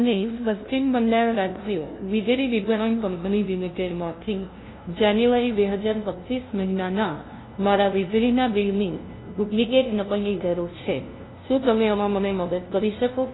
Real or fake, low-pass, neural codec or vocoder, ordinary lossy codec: fake; 7.2 kHz; codec, 16 kHz, 0.5 kbps, FunCodec, trained on LibriTTS, 25 frames a second; AAC, 16 kbps